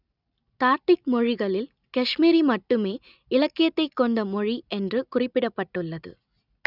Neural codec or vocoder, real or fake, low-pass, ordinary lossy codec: none; real; 5.4 kHz; none